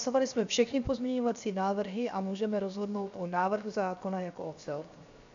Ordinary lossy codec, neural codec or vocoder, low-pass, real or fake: AAC, 64 kbps; codec, 16 kHz, 0.7 kbps, FocalCodec; 7.2 kHz; fake